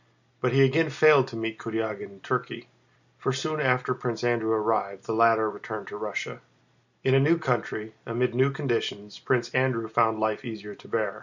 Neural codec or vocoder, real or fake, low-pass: none; real; 7.2 kHz